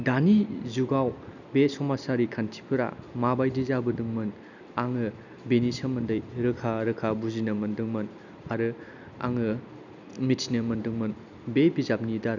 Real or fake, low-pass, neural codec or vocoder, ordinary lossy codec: real; 7.2 kHz; none; none